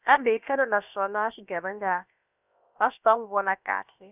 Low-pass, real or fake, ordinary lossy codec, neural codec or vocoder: 3.6 kHz; fake; none; codec, 16 kHz, about 1 kbps, DyCAST, with the encoder's durations